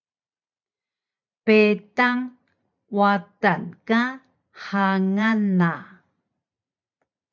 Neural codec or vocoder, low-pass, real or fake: vocoder, 44.1 kHz, 128 mel bands every 512 samples, BigVGAN v2; 7.2 kHz; fake